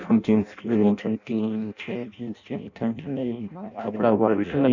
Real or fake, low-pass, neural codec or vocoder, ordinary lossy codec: fake; 7.2 kHz; codec, 16 kHz in and 24 kHz out, 0.6 kbps, FireRedTTS-2 codec; none